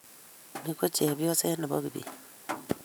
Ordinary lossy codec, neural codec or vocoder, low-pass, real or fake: none; vocoder, 44.1 kHz, 128 mel bands every 512 samples, BigVGAN v2; none; fake